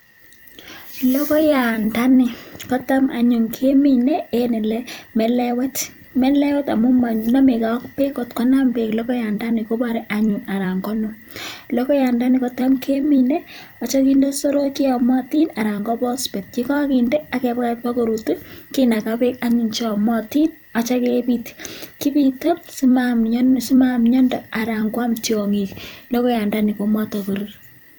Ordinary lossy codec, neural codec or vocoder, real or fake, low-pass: none; vocoder, 44.1 kHz, 128 mel bands every 256 samples, BigVGAN v2; fake; none